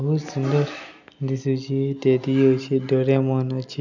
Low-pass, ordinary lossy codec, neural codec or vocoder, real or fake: 7.2 kHz; none; none; real